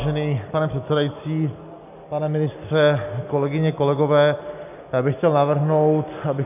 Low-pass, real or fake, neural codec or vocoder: 3.6 kHz; real; none